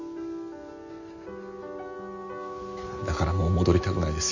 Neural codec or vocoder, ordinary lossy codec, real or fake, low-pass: none; none; real; 7.2 kHz